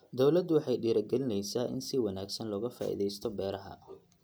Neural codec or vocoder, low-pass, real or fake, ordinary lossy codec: none; none; real; none